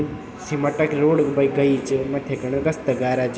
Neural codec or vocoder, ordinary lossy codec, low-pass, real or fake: none; none; none; real